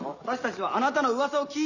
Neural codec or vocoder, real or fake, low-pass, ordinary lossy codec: none; real; 7.2 kHz; none